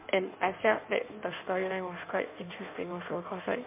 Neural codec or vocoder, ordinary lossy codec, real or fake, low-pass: codec, 16 kHz in and 24 kHz out, 1.1 kbps, FireRedTTS-2 codec; MP3, 32 kbps; fake; 3.6 kHz